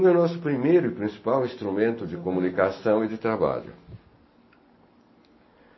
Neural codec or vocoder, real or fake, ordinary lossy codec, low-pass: none; real; MP3, 24 kbps; 7.2 kHz